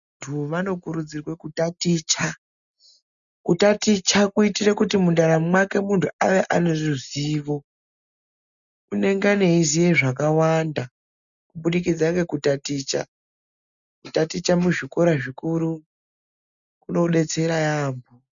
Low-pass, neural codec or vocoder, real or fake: 7.2 kHz; none; real